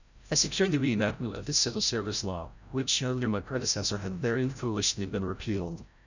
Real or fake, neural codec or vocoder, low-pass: fake; codec, 16 kHz, 0.5 kbps, FreqCodec, larger model; 7.2 kHz